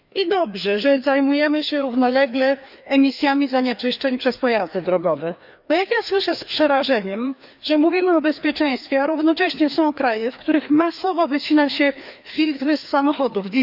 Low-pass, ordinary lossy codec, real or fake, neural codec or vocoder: 5.4 kHz; none; fake; codec, 16 kHz, 2 kbps, FreqCodec, larger model